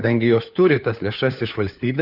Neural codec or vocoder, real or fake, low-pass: codec, 16 kHz, 4 kbps, FunCodec, trained on LibriTTS, 50 frames a second; fake; 5.4 kHz